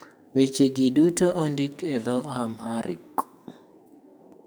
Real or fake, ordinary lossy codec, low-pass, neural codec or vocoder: fake; none; none; codec, 44.1 kHz, 2.6 kbps, SNAC